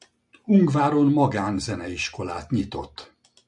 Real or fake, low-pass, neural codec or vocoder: real; 10.8 kHz; none